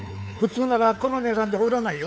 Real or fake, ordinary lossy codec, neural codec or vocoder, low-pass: fake; none; codec, 16 kHz, 4 kbps, X-Codec, WavLM features, trained on Multilingual LibriSpeech; none